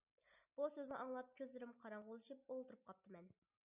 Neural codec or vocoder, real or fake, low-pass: none; real; 3.6 kHz